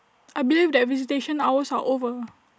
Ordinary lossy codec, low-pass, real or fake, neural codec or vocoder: none; none; real; none